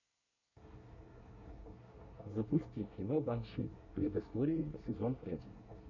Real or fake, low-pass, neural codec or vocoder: fake; 7.2 kHz; codec, 24 kHz, 1 kbps, SNAC